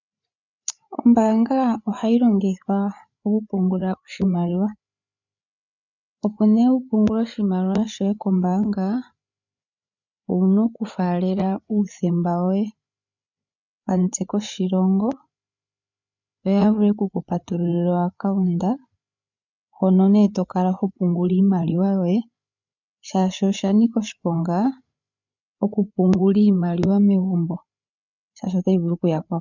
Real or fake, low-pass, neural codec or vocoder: fake; 7.2 kHz; codec, 16 kHz, 8 kbps, FreqCodec, larger model